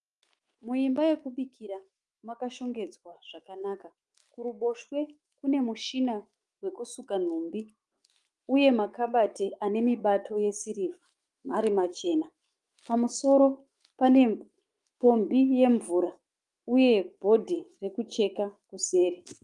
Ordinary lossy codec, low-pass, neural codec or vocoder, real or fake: Opus, 24 kbps; 10.8 kHz; autoencoder, 48 kHz, 128 numbers a frame, DAC-VAE, trained on Japanese speech; fake